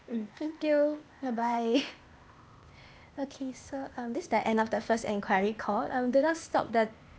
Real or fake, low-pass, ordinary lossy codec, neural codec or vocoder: fake; none; none; codec, 16 kHz, 0.8 kbps, ZipCodec